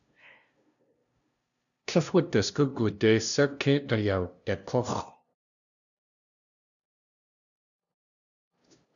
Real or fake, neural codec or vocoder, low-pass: fake; codec, 16 kHz, 0.5 kbps, FunCodec, trained on LibriTTS, 25 frames a second; 7.2 kHz